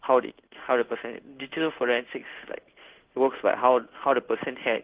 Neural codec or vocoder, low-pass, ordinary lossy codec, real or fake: codec, 16 kHz in and 24 kHz out, 1 kbps, XY-Tokenizer; 3.6 kHz; Opus, 16 kbps; fake